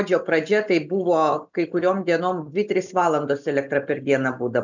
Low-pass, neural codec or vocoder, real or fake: 7.2 kHz; none; real